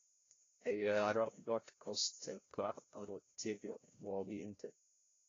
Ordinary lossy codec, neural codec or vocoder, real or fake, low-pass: AAC, 32 kbps; codec, 16 kHz, 0.5 kbps, FreqCodec, larger model; fake; 7.2 kHz